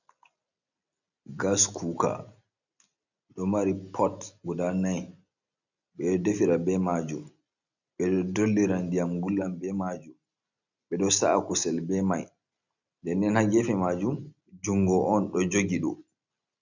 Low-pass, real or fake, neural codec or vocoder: 7.2 kHz; real; none